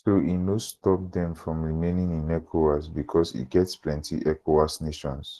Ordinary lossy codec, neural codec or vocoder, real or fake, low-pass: Opus, 16 kbps; none; real; 14.4 kHz